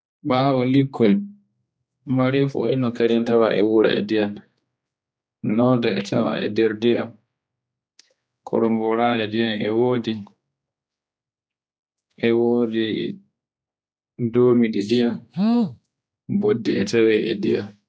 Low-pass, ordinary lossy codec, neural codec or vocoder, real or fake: none; none; codec, 16 kHz, 2 kbps, X-Codec, HuBERT features, trained on general audio; fake